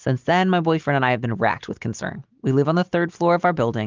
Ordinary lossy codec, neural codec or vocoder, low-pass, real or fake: Opus, 32 kbps; none; 7.2 kHz; real